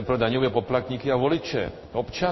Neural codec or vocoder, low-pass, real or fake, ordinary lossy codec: codec, 16 kHz in and 24 kHz out, 1 kbps, XY-Tokenizer; 7.2 kHz; fake; MP3, 24 kbps